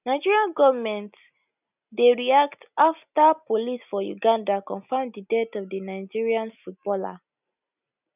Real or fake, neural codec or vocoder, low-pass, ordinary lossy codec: real; none; 3.6 kHz; none